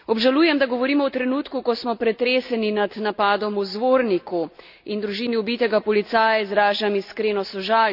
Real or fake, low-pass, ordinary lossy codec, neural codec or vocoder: real; 5.4 kHz; none; none